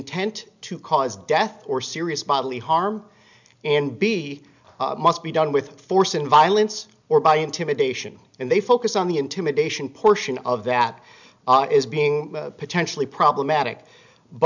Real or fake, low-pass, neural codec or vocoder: real; 7.2 kHz; none